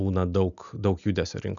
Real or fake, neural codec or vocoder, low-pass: real; none; 7.2 kHz